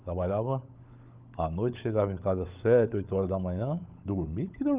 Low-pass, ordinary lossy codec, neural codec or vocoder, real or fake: 3.6 kHz; Opus, 24 kbps; codec, 16 kHz, 16 kbps, FunCodec, trained on LibriTTS, 50 frames a second; fake